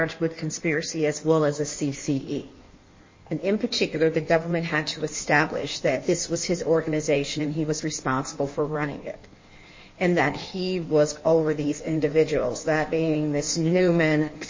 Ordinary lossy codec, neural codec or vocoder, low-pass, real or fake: MP3, 32 kbps; codec, 16 kHz in and 24 kHz out, 1.1 kbps, FireRedTTS-2 codec; 7.2 kHz; fake